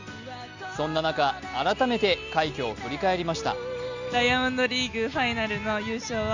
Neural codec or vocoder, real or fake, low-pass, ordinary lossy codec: none; real; 7.2 kHz; Opus, 64 kbps